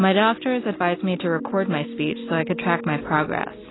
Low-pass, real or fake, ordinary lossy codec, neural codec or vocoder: 7.2 kHz; real; AAC, 16 kbps; none